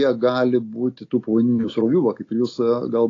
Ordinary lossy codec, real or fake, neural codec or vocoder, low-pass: AAC, 32 kbps; real; none; 7.2 kHz